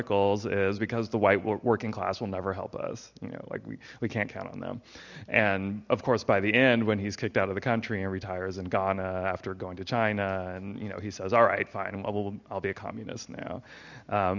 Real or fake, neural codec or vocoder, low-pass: real; none; 7.2 kHz